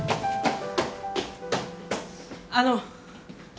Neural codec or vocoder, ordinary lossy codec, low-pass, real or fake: none; none; none; real